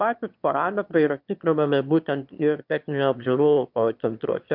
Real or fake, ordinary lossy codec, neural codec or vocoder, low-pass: fake; MP3, 48 kbps; autoencoder, 22.05 kHz, a latent of 192 numbers a frame, VITS, trained on one speaker; 5.4 kHz